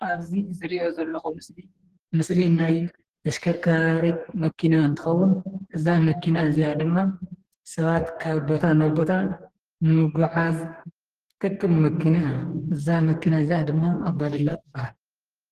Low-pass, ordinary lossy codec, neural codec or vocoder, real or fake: 14.4 kHz; Opus, 16 kbps; codec, 44.1 kHz, 2.6 kbps, DAC; fake